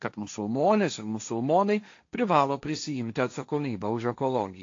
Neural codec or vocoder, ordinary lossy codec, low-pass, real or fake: codec, 16 kHz, 1.1 kbps, Voila-Tokenizer; AAC, 48 kbps; 7.2 kHz; fake